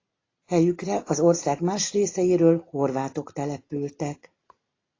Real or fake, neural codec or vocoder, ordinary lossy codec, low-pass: real; none; AAC, 32 kbps; 7.2 kHz